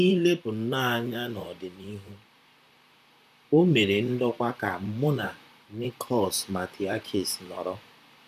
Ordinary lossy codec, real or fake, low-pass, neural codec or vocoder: none; fake; 14.4 kHz; vocoder, 44.1 kHz, 128 mel bands, Pupu-Vocoder